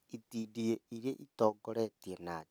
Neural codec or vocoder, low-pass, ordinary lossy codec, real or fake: none; none; none; real